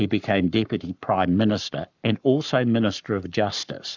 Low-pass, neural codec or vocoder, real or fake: 7.2 kHz; codec, 44.1 kHz, 7.8 kbps, Pupu-Codec; fake